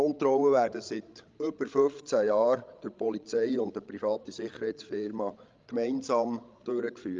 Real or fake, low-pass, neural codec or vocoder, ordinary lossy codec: fake; 7.2 kHz; codec, 16 kHz, 16 kbps, FreqCodec, larger model; Opus, 32 kbps